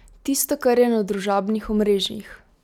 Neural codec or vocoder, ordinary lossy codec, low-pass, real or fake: none; none; 19.8 kHz; real